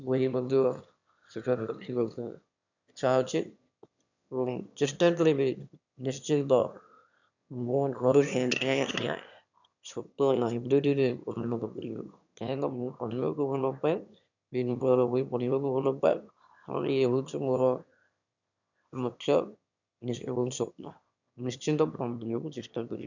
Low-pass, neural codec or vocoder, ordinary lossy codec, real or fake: 7.2 kHz; autoencoder, 22.05 kHz, a latent of 192 numbers a frame, VITS, trained on one speaker; none; fake